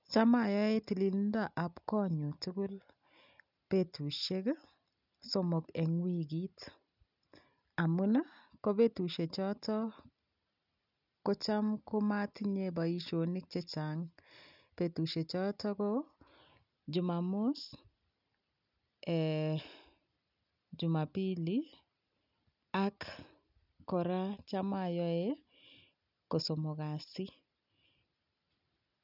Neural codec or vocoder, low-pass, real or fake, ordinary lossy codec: none; 5.4 kHz; real; none